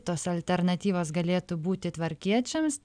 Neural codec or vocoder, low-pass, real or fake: none; 9.9 kHz; real